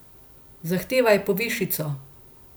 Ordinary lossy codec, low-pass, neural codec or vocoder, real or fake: none; none; none; real